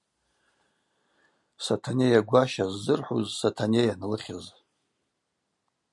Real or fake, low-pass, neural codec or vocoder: real; 10.8 kHz; none